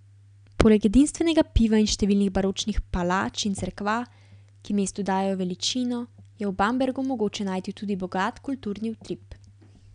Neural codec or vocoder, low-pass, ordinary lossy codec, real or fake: none; 9.9 kHz; none; real